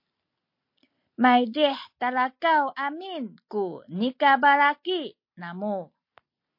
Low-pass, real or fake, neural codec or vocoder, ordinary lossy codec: 5.4 kHz; real; none; MP3, 32 kbps